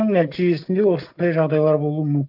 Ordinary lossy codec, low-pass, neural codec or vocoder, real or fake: none; 5.4 kHz; none; real